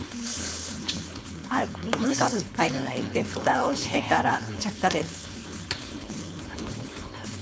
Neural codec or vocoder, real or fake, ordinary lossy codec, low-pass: codec, 16 kHz, 4.8 kbps, FACodec; fake; none; none